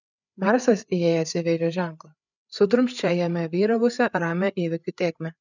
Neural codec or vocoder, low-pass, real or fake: codec, 16 kHz, 8 kbps, FreqCodec, larger model; 7.2 kHz; fake